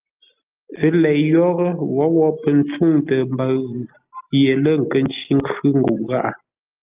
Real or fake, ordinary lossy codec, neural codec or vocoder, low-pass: real; Opus, 24 kbps; none; 3.6 kHz